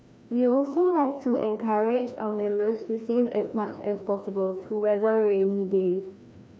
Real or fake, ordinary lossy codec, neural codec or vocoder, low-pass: fake; none; codec, 16 kHz, 1 kbps, FreqCodec, larger model; none